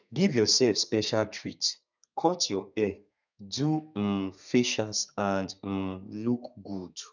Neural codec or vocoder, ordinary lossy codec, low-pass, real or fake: codec, 32 kHz, 1.9 kbps, SNAC; none; 7.2 kHz; fake